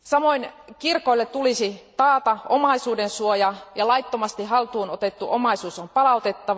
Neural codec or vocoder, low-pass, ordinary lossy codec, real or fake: none; none; none; real